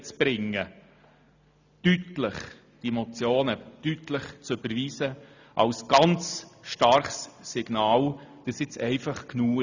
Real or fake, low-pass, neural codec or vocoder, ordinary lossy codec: real; 7.2 kHz; none; none